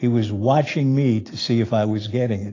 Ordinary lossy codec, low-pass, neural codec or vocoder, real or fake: AAC, 32 kbps; 7.2 kHz; none; real